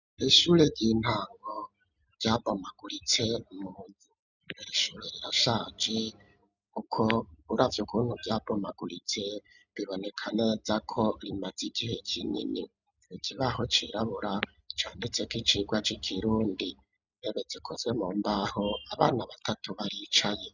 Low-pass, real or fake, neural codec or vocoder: 7.2 kHz; real; none